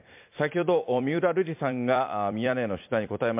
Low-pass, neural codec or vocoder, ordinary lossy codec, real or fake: 3.6 kHz; vocoder, 44.1 kHz, 128 mel bands every 256 samples, BigVGAN v2; MP3, 32 kbps; fake